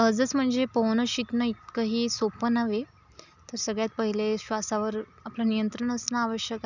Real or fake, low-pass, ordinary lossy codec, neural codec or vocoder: real; 7.2 kHz; none; none